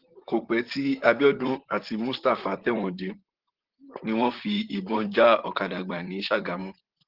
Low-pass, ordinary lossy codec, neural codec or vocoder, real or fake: 5.4 kHz; Opus, 16 kbps; vocoder, 44.1 kHz, 128 mel bands, Pupu-Vocoder; fake